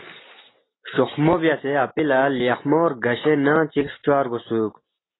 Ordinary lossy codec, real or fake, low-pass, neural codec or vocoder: AAC, 16 kbps; real; 7.2 kHz; none